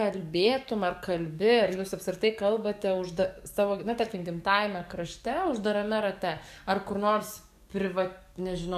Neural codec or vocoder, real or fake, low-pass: codec, 44.1 kHz, 7.8 kbps, DAC; fake; 14.4 kHz